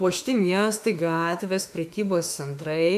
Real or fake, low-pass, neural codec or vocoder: fake; 14.4 kHz; autoencoder, 48 kHz, 32 numbers a frame, DAC-VAE, trained on Japanese speech